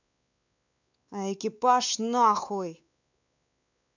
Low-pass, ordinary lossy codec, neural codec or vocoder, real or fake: 7.2 kHz; none; codec, 16 kHz, 4 kbps, X-Codec, WavLM features, trained on Multilingual LibriSpeech; fake